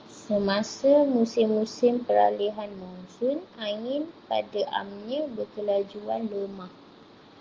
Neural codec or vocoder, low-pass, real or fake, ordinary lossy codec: none; 7.2 kHz; real; Opus, 32 kbps